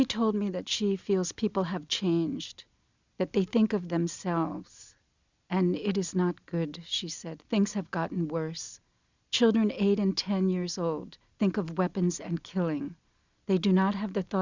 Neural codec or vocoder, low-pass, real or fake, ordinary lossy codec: none; 7.2 kHz; real; Opus, 64 kbps